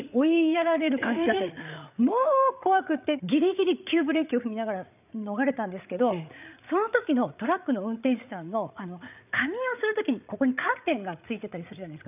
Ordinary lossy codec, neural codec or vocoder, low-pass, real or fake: none; codec, 16 kHz, 8 kbps, FreqCodec, larger model; 3.6 kHz; fake